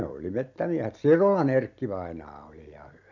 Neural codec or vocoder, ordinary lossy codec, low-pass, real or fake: none; MP3, 64 kbps; 7.2 kHz; real